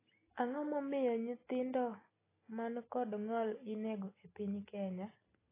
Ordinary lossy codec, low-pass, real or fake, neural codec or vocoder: AAC, 16 kbps; 3.6 kHz; real; none